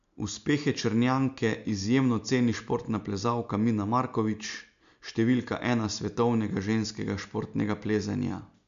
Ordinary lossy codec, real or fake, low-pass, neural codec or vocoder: MP3, 64 kbps; real; 7.2 kHz; none